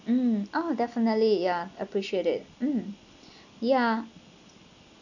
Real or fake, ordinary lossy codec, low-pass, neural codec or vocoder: real; none; 7.2 kHz; none